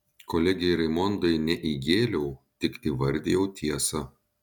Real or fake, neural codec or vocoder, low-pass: real; none; 19.8 kHz